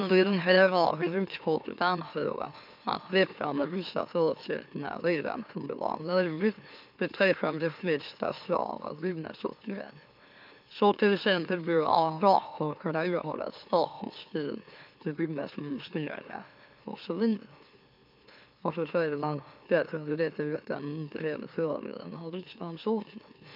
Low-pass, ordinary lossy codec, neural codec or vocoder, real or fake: 5.4 kHz; MP3, 48 kbps; autoencoder, 44.1 kHz, a latent of 192 numbers a frame, MeloTTS; fake